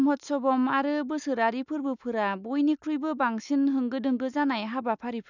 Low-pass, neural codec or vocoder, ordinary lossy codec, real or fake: 7.2 kHz; none; none; real